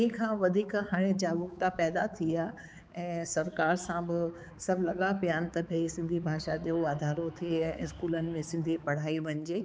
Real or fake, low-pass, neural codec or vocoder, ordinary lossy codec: fake; none; codec, 16 kHz, 4 kbps, X-Codec, HuBERT features, trained on balanced general audio; none